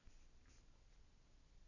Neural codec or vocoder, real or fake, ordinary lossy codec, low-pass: none; real; none; 7.2 kHz